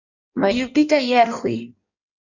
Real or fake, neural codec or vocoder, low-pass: fake; codec, 16 kHz in and 24 kHz out, 1.1 kbps, FireRedTTS-2 codec; 7.2 kHz